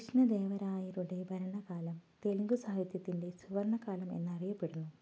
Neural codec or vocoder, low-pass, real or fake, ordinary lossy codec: none; none; real; none